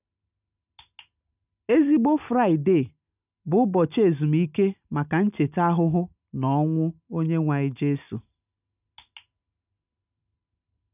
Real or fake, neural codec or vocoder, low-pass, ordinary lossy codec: real; none; 3.6 kHz; none